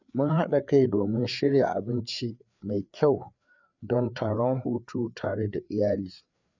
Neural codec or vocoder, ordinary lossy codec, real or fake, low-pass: codec, 16 kHz, 4 kbps, FreqCodec, larger model; none; fake; 7.2 kHz